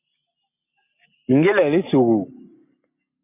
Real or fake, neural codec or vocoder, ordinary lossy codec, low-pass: real; none; MP3, 32 kbps; 3.6 kHz